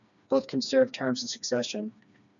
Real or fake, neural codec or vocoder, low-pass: fake; codec, 16 kHz, 2 kbps, FreqCodec, smaller model; 7.2 kHz